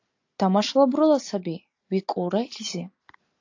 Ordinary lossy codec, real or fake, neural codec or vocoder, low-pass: AAC, 48 kbps; real; none; 7.2 kHz